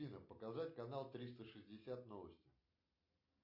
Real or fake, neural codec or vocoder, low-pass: real; none; 5.4 kHz